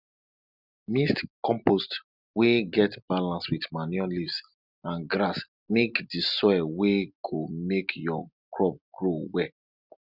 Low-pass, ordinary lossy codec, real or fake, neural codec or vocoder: 5.4 kHz; none; real; none